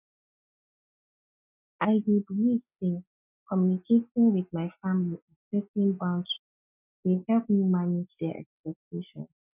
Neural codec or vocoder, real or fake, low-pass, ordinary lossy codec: none; real; 3.6 kHz; none